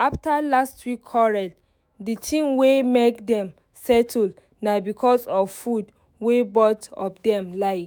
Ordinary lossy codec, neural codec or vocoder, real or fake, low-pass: none; autoencoder, 48 kHz, 128 numbers a frame, DAC-VAE, trained on Japanese speech; fake; none